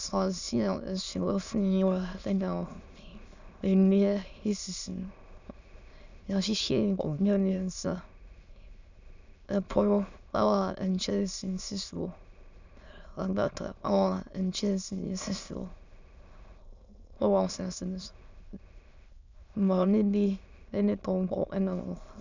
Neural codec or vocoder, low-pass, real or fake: autoencoder, 22.05 kHz, a latent of 192 numbers a frame, VITS, trained on many speakers; 7.2 kHz; fake